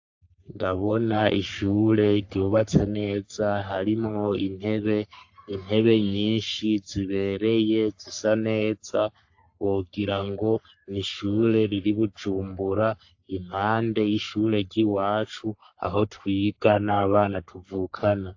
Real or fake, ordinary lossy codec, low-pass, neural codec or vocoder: fake; AAC, 48 kbps; 7.2 kHz; codec, 44.1 kHz, 3.4 kbps, Pupu-Codec